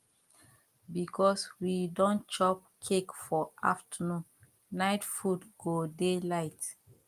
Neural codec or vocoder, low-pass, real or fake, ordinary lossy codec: none; 14.4 kHz; real; Opus, 32 kbps